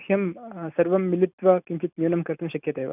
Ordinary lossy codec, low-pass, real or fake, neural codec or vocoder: none; 3.6 kHz; real; none